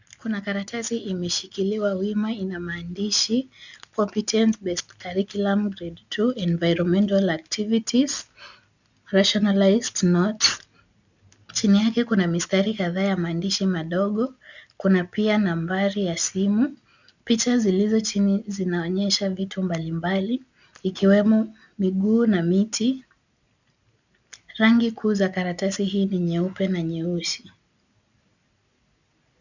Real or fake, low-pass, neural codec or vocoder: real; 7.2 kHz; none